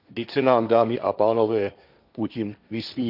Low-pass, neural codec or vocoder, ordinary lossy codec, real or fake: 5.4 kHz; codec, 16 kHz, 1.1 kbps, Voila-Tokenizer; none; fake